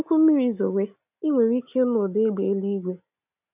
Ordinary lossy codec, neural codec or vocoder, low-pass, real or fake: none; none; 3.6 kHz; real